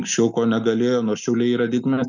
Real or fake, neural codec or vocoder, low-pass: real; none; 7.2 kHz